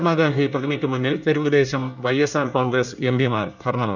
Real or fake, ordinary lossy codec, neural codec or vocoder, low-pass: fake; none; codec, 24 kHz, 1 kbps, SNAC; 7.2 kHz